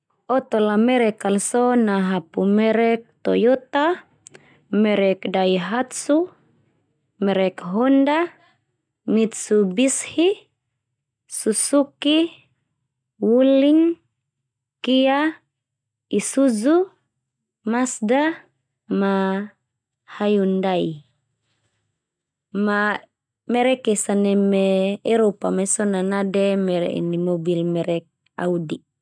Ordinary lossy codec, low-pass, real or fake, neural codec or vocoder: none; 9.9 kHz; real; none